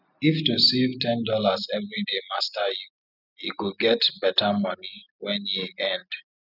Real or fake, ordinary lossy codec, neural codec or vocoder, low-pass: real; none; none; 5.4 kHz